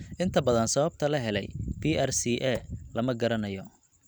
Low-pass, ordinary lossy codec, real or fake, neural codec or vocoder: none; none; real; none